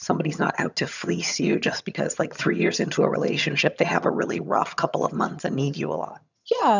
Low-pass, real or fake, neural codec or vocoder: 7.2 kHz; fake; vocoder, 22.05 kHz, 80 mel bands, HiFi-GAN